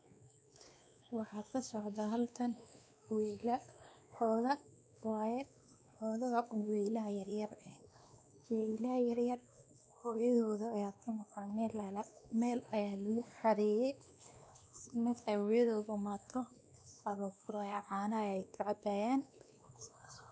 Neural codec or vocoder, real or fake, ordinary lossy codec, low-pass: codec, 16 kHz, 2 kbps, X-Codec, WavLM features, trained on Multilingual LibriSpeech; fake; none; none